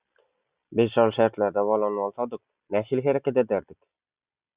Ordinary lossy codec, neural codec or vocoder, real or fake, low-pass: Opus, 64 kbps; none; real; 3.6 kHz